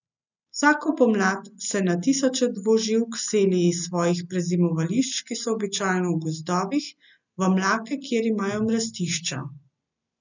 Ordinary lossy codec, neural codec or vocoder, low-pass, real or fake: none; none; 7.2 kHz; real